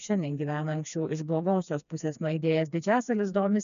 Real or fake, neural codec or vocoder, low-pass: fake; codec, 16 kHz, 2 kbps, FreqCodec, smaller model; 7.2 kHz